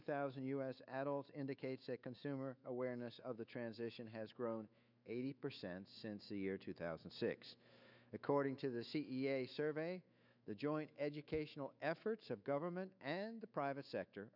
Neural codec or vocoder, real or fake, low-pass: autoencoder, 48 kHz, 128 numbers a frame, DAC-VAE, trained on Japanese speech; fake; 5.4 kHz